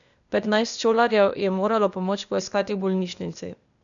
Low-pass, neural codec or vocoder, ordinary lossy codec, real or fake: 7.2 kHz; codec, 16 kHz, 0.8 kbps, ZipCodec; none; fake